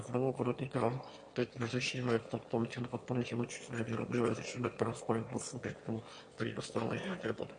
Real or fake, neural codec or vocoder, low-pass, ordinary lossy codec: fake; autoencoder, 22.05 kHz, a latent of 192 numbers a frame, VITS, trained on one speaker; 9.9 kHz; MP3, 48 kbps